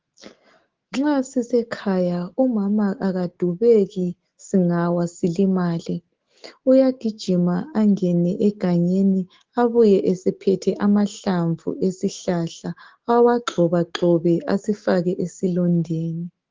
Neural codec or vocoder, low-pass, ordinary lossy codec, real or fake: autoencoder, 48 kHz, 128 numbers a frame, DAC-VAE, trained on Japanese speech; 7.2 kHz; Opus, 16 kbps; fake